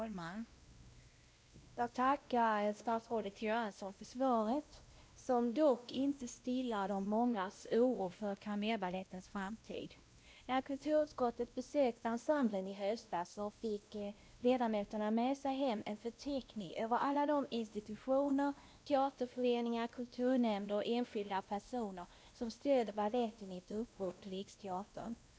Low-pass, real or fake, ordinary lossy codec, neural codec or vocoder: none; fake; none; codec, 16 kHz, 1 kbps, X-Codec, WavLM features, trained on Multilingual LibriSpeech